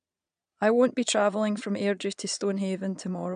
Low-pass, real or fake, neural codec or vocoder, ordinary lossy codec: 9.9 kHz; fake; vocoder, 22.05 kHz, 80 mel bands, Vocos; none